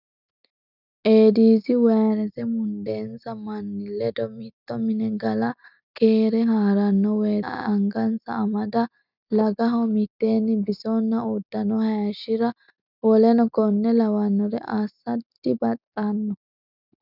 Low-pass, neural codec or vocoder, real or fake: 5.4 kHz; none; real